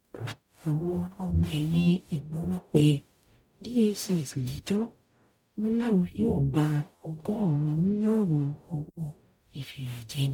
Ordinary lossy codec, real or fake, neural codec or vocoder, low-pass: MP3, 96 kbps; fake; codec, 44.1 kHz, 0.9 kbps, DAC; 19.8 kHz